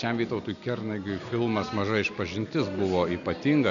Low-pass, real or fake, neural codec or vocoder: 7.2 kHz; real; none